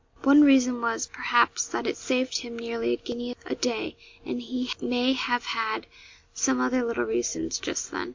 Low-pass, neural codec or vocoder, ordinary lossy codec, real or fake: 7.2 kHz; none; MP3, 48 kbps; real